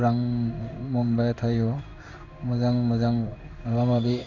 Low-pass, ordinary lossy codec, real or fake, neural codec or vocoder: 7.2 kHz; none; fake; codec, 16 kHz in and 24 kHz out, 1 kbps, XY-Tokenizer